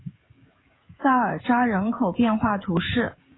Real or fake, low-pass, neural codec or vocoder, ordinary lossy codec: fake; 7.2 kHz; codec, 16 kHz, 16 kbps, FreqCodec, smaller model; AAC, 16 kbps